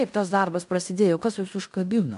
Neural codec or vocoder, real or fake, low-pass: codec, 16 kHz in and 24 kHz out, 0.9 kbps, LongCat-Audio-Codec, fine tuned four codebook decoder; fake; 10.8 kHz